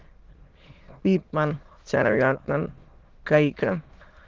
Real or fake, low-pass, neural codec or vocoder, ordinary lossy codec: fake; 7.2 kHz; autoencoder, 22.05 kHz, a latent of 192 numbers a frame, VITS, trained on many speakers; Opus, 16 kbps